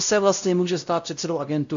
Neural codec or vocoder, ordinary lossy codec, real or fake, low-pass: codec, 16 kHz, 0.5 kbps, X-Codec, WavLM features, trained on Multilingual LibriSpeech; MP3, 64 kbps; fake; 7.2 kHz